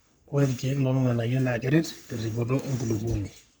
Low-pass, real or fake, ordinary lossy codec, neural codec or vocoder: none; fake; none; codec, 44.1 kHz, 3.4 kbps, Pupu-Codec